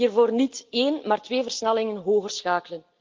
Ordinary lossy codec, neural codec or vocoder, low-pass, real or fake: Opus, 32 kbps; none; 7.2 kHz; real